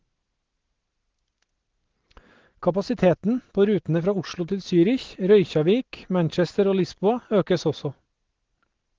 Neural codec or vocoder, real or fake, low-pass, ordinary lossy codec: none; real; 7.2 kHz; Opus, 16 kbps